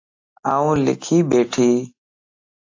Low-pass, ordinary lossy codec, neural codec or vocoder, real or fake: 7.2 kHz; AAC, 48 kbps; none; real